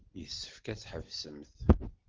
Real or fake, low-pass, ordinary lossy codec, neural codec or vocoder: real; 7.2 kHz; Opus, 16 kbps; none